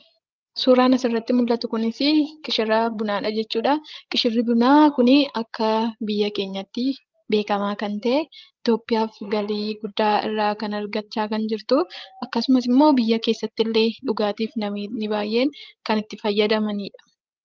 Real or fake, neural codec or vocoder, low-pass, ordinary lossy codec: fake; codec, 16 kHz, 16 kbps, FreqCodec, larger model; 7.2 kHz; Opus, 32 kbps